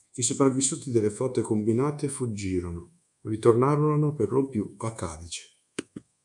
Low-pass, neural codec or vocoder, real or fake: 10.8 kHz; codec, 24 kHz, 1.2 kbps, DualCodec; fake